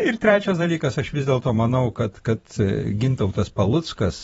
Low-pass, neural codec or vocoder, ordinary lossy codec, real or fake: 19.8 kHz; vocoder, 44.1 kHz, 128 mel bands every 512 samples, BigVGAN v2; AAC, 24 kbps; fake